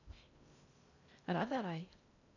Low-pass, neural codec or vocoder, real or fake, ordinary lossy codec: 7.2 kHz; codec, 16 kHz in and 24 kHz out, 0.6 kbps, FocalCodec, streaming, 2048 codes; fake; none